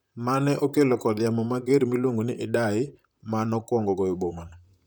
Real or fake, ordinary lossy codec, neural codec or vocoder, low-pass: fake; none; vocoder, 44.1 kHz, 128 mel bands, Pupu-Vocoder; none